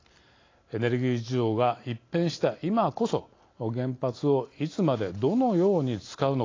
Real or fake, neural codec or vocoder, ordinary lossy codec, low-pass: real; none; AAC, 32 kbps; 7.2 kHz